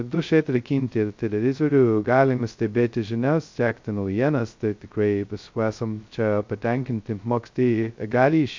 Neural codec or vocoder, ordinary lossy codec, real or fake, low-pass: codec, 16 kHz, 0.2 kbps, FocalCodec; MP3, 48 kbps; fake; 7.2 kHz